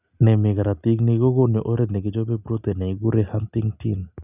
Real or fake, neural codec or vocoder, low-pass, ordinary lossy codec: real; none; 3.6 kHz; none